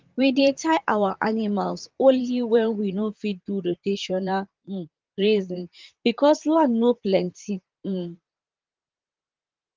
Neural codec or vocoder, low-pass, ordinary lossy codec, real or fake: vocoder, 44.1 kHz, 128 mel bands, Pupu-Vocoder; 7.2 kHz; Opus, 32 kbps; fake